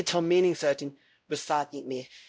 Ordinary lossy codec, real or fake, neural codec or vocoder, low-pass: none; fake; codec, 16 kHz, 0.5 kbps, X-Codec, WavLM features, trained on Multilingual LibriSpeech; none